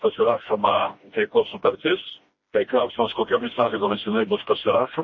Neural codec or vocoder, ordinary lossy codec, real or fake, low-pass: codec, 16 kHz, 1 kbps, FreqCodec, smaller model; MP3, 32 kbps; fake; 7.2 kHz